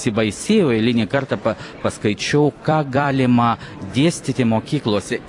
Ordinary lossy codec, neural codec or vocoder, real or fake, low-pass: AAC, 48 kbps; vocoder, 44.1 kHz, 128 mel bands every 256 samples, BigVGAN v2; fake; 10.8 kHz